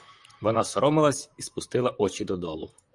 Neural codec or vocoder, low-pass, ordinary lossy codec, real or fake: vocoder, 44.1 kHz, 128 mel bands, Pupu-Vocoder; 10.8 kHz; Opus, 64 kbps; fake